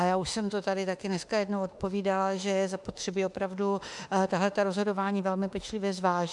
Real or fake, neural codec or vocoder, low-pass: fake; autoencoder, 48 kHz, 32 numbers a frame, DAC-VAE, trained on Japanese speech; 10.8 kHz